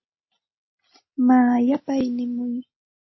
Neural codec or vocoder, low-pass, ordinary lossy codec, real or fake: none; 7.2 kHz; MP3, 24 kbps; real